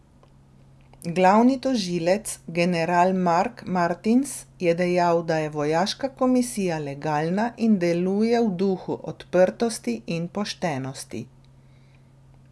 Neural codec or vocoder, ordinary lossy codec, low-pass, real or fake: none; none; none; real